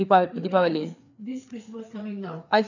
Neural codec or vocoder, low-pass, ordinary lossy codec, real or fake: codec, 16 kHz, 4 kbps, FreqCodec, larger model; 7.2 kHz; none; fake